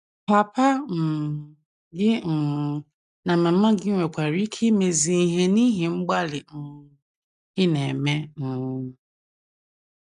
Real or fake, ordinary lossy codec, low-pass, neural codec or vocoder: real; none; 10.8 kHz; none